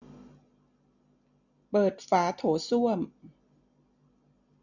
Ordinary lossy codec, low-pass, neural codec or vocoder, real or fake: none; 7.2 kHz; none; real